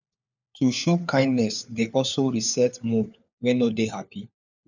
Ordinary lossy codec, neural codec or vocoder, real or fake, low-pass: none; codec, 16 kHz, 4 kbps, FunCodec, trained on LibriTTS, 50 frames a second; fake; 7.2 kHz